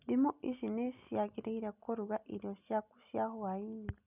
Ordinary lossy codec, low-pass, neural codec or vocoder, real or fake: none; 3.6 kHz; none; real